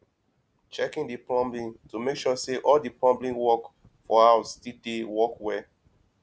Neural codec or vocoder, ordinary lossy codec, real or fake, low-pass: none; none; real; none